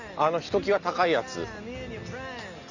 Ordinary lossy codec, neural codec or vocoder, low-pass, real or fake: none; none; 7.2 kHz; real